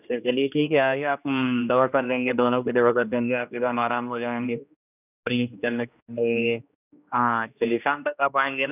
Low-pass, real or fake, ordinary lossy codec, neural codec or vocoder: 3.6 kHz; fake; none; codec, 16 kHz, 1 kbps, X-Codec, HuBERT features, trained on general audio